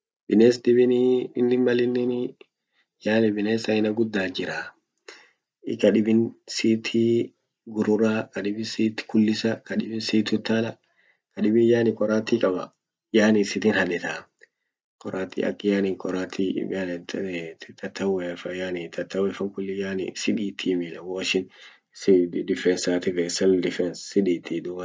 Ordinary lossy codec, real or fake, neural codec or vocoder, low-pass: none; real; none; none